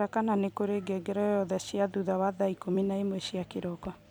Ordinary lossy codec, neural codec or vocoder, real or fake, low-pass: none; vocoder, 44.1 kHz, 128 mel bands every 256 samples, BigVGAN v2; fake; none